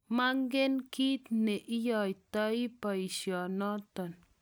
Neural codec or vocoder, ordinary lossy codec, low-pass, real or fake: none; none; none; real